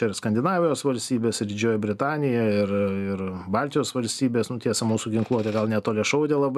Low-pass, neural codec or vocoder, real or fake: 14.4 kHz; none; real